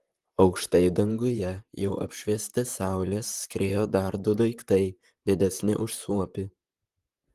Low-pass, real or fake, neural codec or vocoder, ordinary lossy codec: 14.4 kHz; fake; vocoder, 44.1 kHz, 128 mel bands, Pupu-Vocoder; Opus, 24 kbps